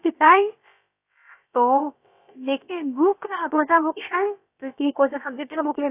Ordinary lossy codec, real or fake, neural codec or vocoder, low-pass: AAC, 24 kbps; fake; codec, 16 kHz, about 1 kbps, DyCAST, with the encoder's durations; 3.6 kHz